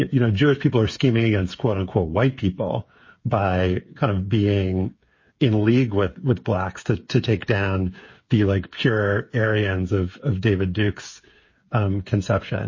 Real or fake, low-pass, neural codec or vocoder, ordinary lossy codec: fake; 7.2 kHz; codec, 16 kHz, 8 kbps, FreqCodec, smaller model; MP3, 32 kbps